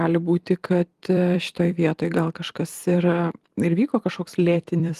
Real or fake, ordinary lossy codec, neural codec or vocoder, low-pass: fake; Opus, 24 kbps; vocoder, 44.1 kHz, 128 mel bands every 256 samples, BigVGAN v2; 14.4 kHz